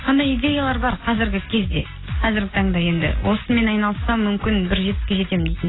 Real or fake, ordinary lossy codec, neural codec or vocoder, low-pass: real; AAC, 16 kbps; none; 7.2 kHz